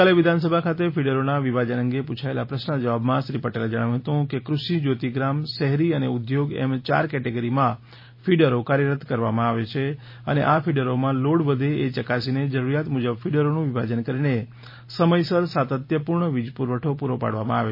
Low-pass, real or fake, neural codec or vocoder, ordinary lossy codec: 5.4 kHz; real; none; MP3, 24 kbps